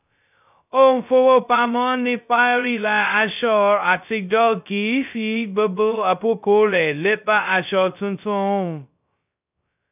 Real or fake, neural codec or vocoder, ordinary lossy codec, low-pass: fake; codec, 16 kHz, 0.2 kbps, FocalCodec; none; 3.6 kHz